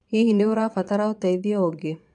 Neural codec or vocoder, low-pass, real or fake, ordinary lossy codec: vocoder, 22.05 kHz, 80 mel bands, Vocos; 9.9 kHz; fake; none